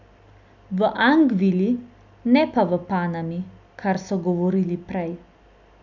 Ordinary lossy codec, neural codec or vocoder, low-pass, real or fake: none; none; 7.2 kHz; real